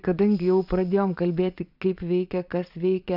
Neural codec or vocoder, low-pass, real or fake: vocoder, 22.05 kHz, 80 mel bands, Vocos; 5.4 kHz; fake